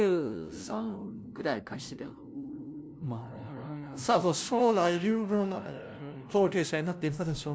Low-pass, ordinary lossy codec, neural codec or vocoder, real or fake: none; none; codec, 16 kHz, 0.5 kbps, FunCodec, trained on LibriTTS, 25 frames a second; fake